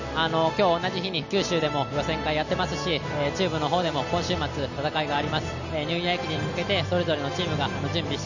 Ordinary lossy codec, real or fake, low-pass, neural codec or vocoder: none; real; 7.2 kHz; none